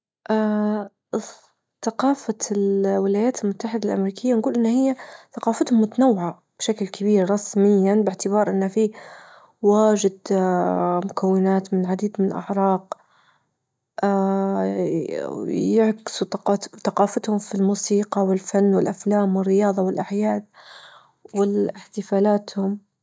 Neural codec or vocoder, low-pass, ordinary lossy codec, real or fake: none; none; none; real